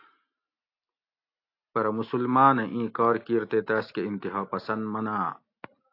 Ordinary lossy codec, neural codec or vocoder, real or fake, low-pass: AAC, 32 kbps; none; real; 5.4 kHz